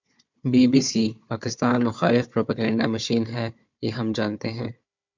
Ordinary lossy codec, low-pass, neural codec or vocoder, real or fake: MP3, 64 kbps; 7.2 kHz; codec, 16 kHz, 4 kbps, FunCodec, trained on Chinese and English, 50 frames a second; fake